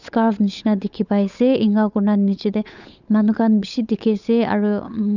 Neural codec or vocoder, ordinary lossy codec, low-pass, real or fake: codec, 16 kHz, 8 kbps, FunCodec, trained on Chinese and English, 25 frames a second; none; 7.2 kHz; fake